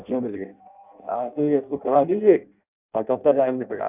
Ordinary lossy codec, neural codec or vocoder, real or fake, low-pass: none; codec, 16 kHz in and 24 kHz out, 0.6 kbps, FireRedTTS-2 codec; fake; 3.6 kHz